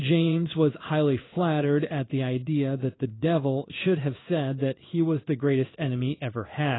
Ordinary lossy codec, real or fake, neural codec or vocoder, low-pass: AAC, 16 kbps; fake; codec, 16 kHz in and 24 kHz out, 1 kbps, XY-Tokenizer; 7.2 kHz